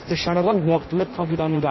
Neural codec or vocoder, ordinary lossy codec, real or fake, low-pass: codec, 16 kHz in and 24 kHz out, 0.6 kbps, FireRedTTS-2 codec; MP3, 24 kbps; fake; 7.2 kHz